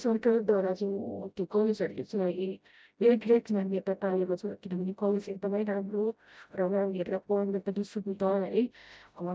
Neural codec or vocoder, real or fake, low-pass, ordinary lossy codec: codec, 16 kHz, 0.5 kbps, FreqCodec, smaller model; fake; none; none